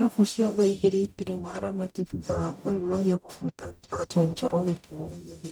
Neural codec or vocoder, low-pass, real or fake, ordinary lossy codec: codec, 44.1 kHz, 0.9 kbps, DAC; none; fake; none